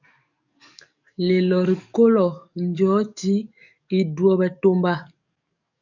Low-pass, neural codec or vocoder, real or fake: 7.2 kHz; codec, 44.1 kHz, 7.8 kbps, DAC; fake